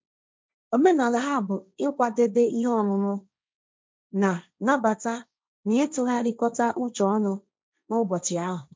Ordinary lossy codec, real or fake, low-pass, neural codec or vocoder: none; fake; none; codec, 16 kHz, 1.1 kbps, Voila-Tokenizer